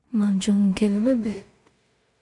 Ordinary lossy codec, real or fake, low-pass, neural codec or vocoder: MP3, 64 kbps; fake; 10.8 kHz; codec, 16 kHz in and 24 kHz out, 0.4 kbps, LongCat-Audio-Codec, two codebook decoder